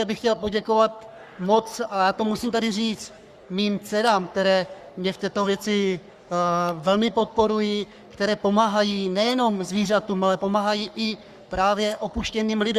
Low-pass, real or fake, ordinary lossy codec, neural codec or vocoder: 14.4 kHz; fake; Opus, 64 kbps; codec, 44.1 kHz, 3.4 kbps, Pupu-Codec